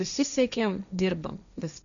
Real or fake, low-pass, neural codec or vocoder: fake; 7.2 kHz; codec, 16 kHz, 1.1 kbps, Voila-Tokenizer